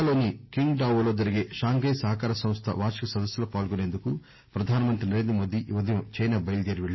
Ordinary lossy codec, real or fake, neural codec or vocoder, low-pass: MP3, 24 kbps; real; none; 7.2 kHz